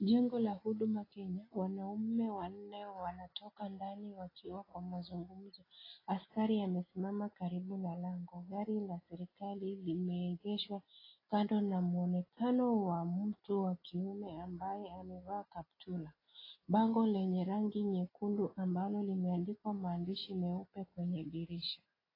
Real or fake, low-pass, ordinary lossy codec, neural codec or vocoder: real; 5.4 kHz; AAC, 24 kbps; none